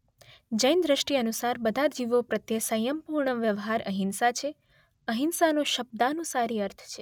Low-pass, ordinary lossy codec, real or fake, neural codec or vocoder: 19.8 kHz; none; real; none